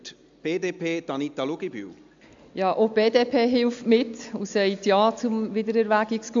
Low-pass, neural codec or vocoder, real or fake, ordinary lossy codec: 7.2 kHz; none; real; AAC, 64 kbps